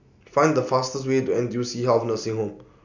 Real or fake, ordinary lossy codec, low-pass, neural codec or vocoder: real; none; 7.2 kHz; none